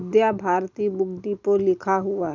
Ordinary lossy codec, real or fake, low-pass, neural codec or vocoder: none; real; 7.2 kHz; none